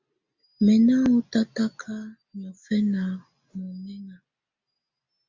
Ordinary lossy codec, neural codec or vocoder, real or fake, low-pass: MP3, 64 kbps; none; real; 7.2 kHz